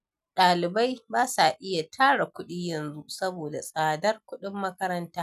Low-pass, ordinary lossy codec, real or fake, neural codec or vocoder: 14.4 kHz; none; real; none